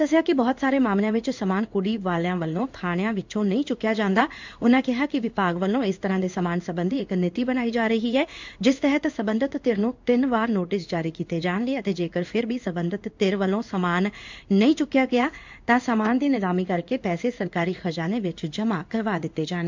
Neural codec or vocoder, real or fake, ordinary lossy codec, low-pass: codec, 16 kHz in and 24 kHz out, 1 kbps, XY-Tokenizer; fake; MP3, 64 kbps; 7.2 kHz